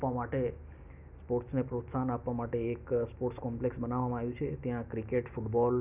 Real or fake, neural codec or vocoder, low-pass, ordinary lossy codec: real; none; 3.6 kHz; none